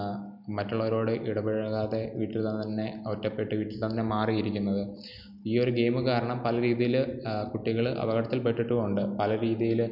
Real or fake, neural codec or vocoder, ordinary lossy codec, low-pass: real; none; none; 5.4 kHz